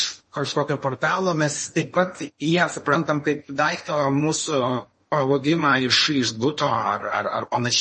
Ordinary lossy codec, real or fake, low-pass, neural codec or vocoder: MP3, 32 kbps; fake; 10.8 kHz; codec, 16 kHz in and 24 kHz out, 0.8 kbps, FocalCodec, streaming, 65536 codes